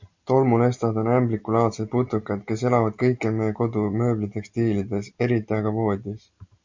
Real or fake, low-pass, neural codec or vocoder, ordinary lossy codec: real; 7.2 kHz; none; MP3, 64 kbps